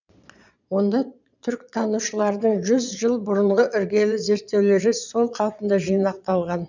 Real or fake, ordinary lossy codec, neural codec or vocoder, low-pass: fake; none; vocoder, 22.05 kHz, 80 mel bands, Vocos; 7.2 kHz